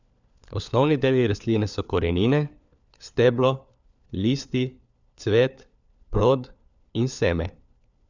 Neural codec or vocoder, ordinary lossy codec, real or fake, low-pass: codec, 16 kHz, 4 kbps, FunCodec, trained on LibriTTS, 50 frames a second; none; fake; 7.2 kHz